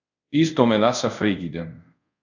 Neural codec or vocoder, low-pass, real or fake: codec, 24 kHz, 0.5 kbps, DualCodec; 7.2 kHz; fake